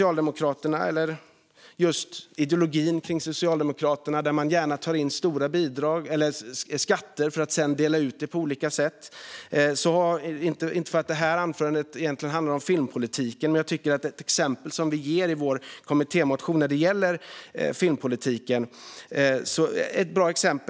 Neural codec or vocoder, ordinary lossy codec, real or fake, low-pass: none; none; real; none